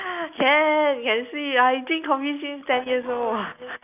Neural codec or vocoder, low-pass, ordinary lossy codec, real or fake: none; 3.6 kHz; none; real